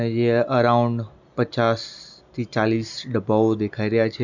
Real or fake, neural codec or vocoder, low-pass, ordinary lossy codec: real; none; 7.2 kHz; none